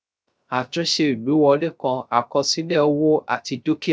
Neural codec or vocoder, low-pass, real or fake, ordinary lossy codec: codec, 16 kHz, 0.3 kbps, FocalCodec; none; fake; none